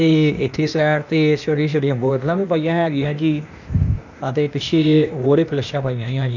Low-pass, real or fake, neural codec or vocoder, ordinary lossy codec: 7.2 kHz; fake; codec, 16 kHz, 0.8 kbps, ZipCodec; none